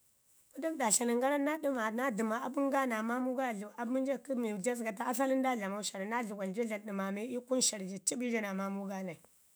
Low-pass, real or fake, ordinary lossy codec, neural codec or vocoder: none; fake; none; autoencoder, 48 kHz, 128 numbers a frame, DAC-VAE, trained on Japanese speech